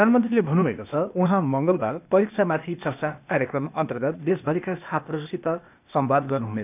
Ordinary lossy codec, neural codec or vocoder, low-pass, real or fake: none; codec, 16 kHz, 0.8 kbps, ZipCodec; 3.6 kHz; fake